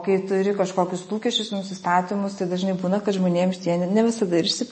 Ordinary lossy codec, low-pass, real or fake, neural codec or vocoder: MP3, 32 kbps; 10.8 kHz; real; none